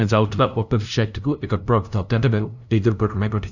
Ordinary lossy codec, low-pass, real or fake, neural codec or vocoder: none; 7.2 kHz; fake; codec, 16 kHz, 0.5 kbps, FunCodec, trained on LibriTTS, 25 frames a second